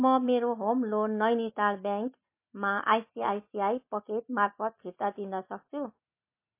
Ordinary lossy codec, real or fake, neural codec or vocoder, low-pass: MP3, 24 kbps; real; none; 3.6 kHz